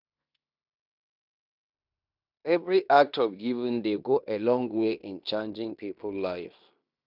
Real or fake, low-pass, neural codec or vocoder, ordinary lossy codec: fake; 5.4 kHz; codec, 16 kHz in and 24 kHz out, 0.9 kbps, LongCat-Audio-Codec, fine tuned four codebook decoder; none